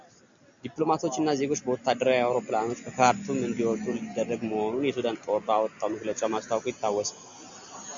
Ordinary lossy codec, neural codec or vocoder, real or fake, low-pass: MP3, 64 kbps; none; real; 7.2 kHz